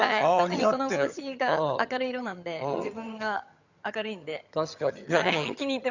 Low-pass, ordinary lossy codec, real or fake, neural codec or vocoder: 7.2 kHz; Opus, 64 kbps; fake; vocoder, 22.05 kHz, 80 mel bands, HiFi-GAN